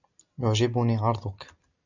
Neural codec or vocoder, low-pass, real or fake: none; 7.2 kHz; real